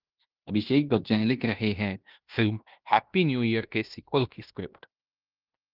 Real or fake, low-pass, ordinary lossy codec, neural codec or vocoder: fake; 5.4 kHz; Opus, 32 kbps; codec, 16 kHz in and 24 kHz out, 0.9 kbps, LongCat-Audio-Codec, fine tuned four codebook decoder